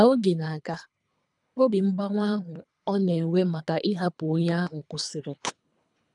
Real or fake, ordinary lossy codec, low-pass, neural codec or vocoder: fake; none; 10.8 kHz; codec, 24 kHz, 3 kbps, HILCodec